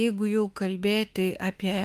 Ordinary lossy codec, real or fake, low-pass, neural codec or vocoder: Opus, 32 kbps; fake; 14.4 kHz; autoencoder, 48 kHz, 32 numbers a frame, DAC-VAE, trained on Japanese speech